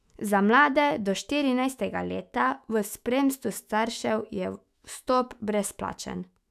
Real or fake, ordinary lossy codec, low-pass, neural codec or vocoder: fake; none; 14.4 kHz; autoencoder, 48 kHz, 128 numbers a frame, DAC-VAE, trained on Japanese speech